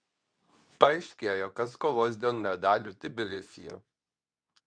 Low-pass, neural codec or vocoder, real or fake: 9.9 kHz; codec, 24 kHz, 0.9 kbps, WavTokenizer, medium speech release version 2; fake